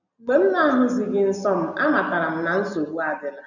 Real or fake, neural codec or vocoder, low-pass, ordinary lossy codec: real; none; 7.2 kHz; none